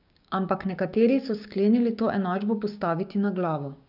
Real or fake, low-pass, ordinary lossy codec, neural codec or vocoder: fake; 5.4 kHz; none; codec, 16 kHz, 6 kbps, DAC